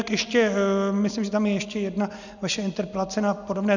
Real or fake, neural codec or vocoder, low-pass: real; none; 7.2 kHz